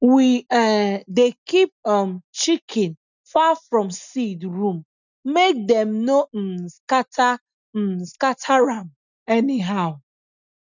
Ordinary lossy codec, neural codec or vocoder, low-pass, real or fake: none; none; 7.2 kHz; real